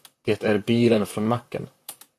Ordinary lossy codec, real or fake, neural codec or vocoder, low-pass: AAC, 96 kbps; fake; vocoder, 44.1 kHz, 128 mel bands, Pupu-Vocoder; 14.4 kHz